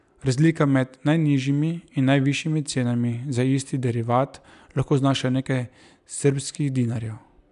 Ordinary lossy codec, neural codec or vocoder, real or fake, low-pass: none; none; real; 10.8 kHz